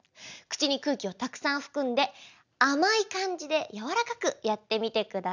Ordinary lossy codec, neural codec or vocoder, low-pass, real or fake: none; none; 7.2 kHz; real